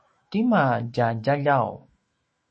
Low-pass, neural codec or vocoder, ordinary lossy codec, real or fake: 10.8 kHz; autoencoder, 48 kHz, 128 numbers a frame, DAC-VAE, trained on Japanese speech; MP3, 32 kbps; fake